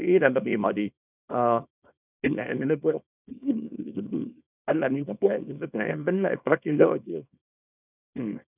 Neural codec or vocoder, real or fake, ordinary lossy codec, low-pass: codec, 24 kHz, 0.9 kbps, WavTokenizer, small release; fake; none; 3.6 kHz